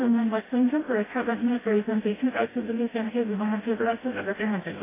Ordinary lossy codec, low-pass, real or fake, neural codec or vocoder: AAC, 16 kbps; 3.6 kHz; fake; codec, 16 kHz, 0.5 kbps, FreqCodec, smaller model